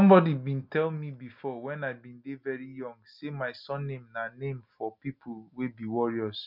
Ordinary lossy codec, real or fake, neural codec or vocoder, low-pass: none; real; none; 5.4 kHz